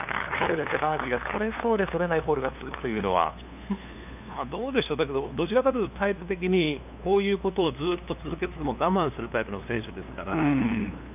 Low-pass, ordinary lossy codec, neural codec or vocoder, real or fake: 3.6 kHz; none; codec, 16 kHz, 2 kbps, FunCodec, trained on LibriTTS, 25 frames a second; fake